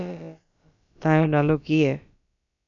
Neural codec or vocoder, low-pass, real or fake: codec, 16 kHz, about 1 kbps, DyCAST, with the encoder's durations; 7.2 kHz; fake